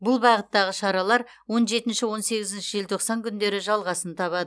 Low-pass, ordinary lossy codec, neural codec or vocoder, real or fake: none; none; none; real